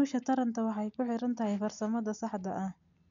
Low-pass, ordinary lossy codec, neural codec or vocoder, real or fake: 7.2 kHz; none; none; real